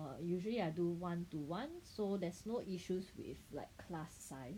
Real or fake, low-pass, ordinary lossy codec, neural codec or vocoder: real; 19.8 kHz; none; none